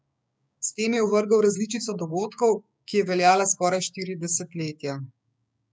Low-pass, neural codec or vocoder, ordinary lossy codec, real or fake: none; codec, 16 kHz, 6 kbps, DAC; none; fake